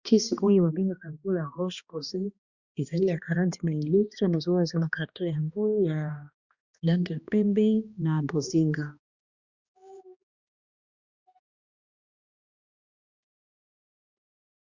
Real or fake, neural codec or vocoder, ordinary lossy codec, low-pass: fake; codec, 16 kHz, 1 kbps, X-Codec, HuBERT features, trained on balanced general audio; Opus, 64 kbps; 7.2 kHz